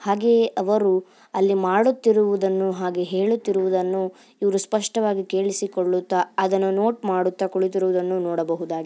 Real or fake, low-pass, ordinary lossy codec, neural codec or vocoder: real; none; none; none